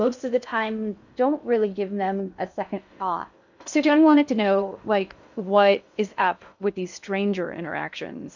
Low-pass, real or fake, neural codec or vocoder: 7.2 kHz; fake; codec, 16 kHz in and 24 kHz out, 0.6 kbps, FocalCodec, streaming, 2048 codes